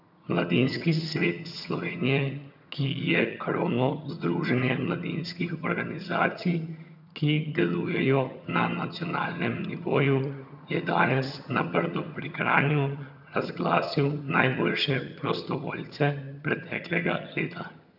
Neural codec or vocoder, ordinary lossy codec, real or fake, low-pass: vocoder, 22.05 kHz, 80 mel bands, HiFi-GAN; none; fake; 5.4 kHz